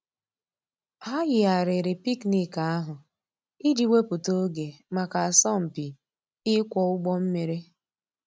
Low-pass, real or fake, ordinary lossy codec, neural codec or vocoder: none; real; none; none